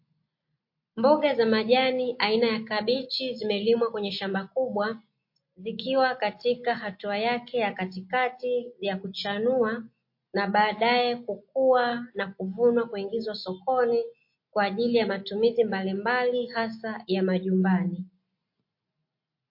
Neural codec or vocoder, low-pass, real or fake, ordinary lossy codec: none; 5.4 kHz; real; MP3, 32 kbps